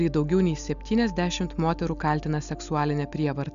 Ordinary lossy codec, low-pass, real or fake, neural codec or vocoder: MP3, 96 kbps; 7.2 kHz; real; none